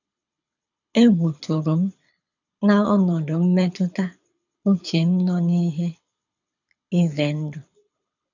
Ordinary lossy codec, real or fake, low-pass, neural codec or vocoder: none; fake; 7.2 kHz; codec, 24 kHz, 6 kbps, HILCodec